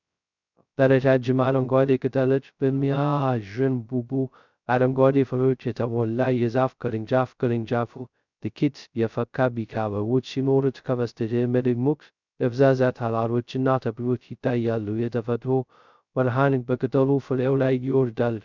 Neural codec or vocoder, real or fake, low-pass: codec, 16 kHz, 0.2 kbps, FocalCodec; fake; 7.2 kHz